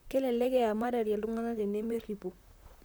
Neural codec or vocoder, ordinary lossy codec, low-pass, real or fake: vocoder, 44.1 kHz, 128 mel bands, Pupu-Vocoder; none; none; fake